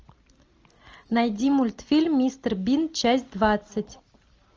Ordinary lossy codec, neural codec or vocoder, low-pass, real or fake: Opus, 24 kbps; none; 7.2 kHz; real